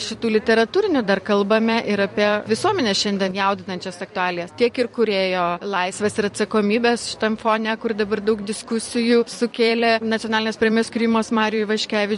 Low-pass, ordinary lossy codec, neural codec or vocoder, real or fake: 14.4 kHz; MP3, 48 kbps; vocoder, 44.1 kHz, 128 mel bands every 256 samples, BigVGAN v2; fake